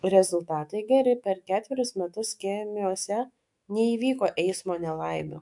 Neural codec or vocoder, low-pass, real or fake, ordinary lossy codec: autoencoder, 48 kHz, 128 numbers a frame, DAC-VAE, trained on Japanese speech; 10.8 kHz; fake; MP3, 64 kbps